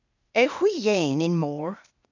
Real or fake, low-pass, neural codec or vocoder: fake; 7.2 kHz; codec, 16 kHz, 0.8 kbps, ZipCodec